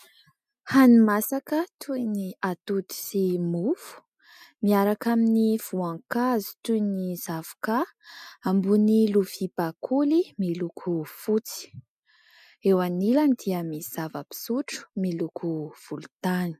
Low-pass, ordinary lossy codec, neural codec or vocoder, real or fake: 14.4 kHz; MP3, 96 kbps; none; real